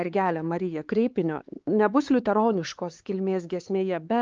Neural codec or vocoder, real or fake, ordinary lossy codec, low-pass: codec, 16 kHz, 4 kbps, X-Codec, HuBERT features, trained on LibriSpeech; fake; Opus, 24 kbps; 7.2 kHz